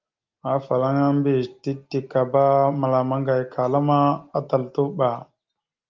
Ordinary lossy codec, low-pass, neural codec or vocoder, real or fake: Opus, 32 kbps; 7.2 kHz; none; real